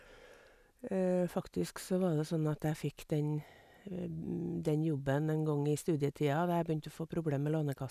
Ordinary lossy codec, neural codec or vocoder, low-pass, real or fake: none; none; 14.4 kHz; real